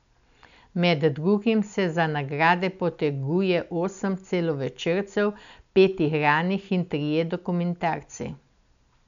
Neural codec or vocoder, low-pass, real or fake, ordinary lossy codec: none; 7.2 kHz; real; none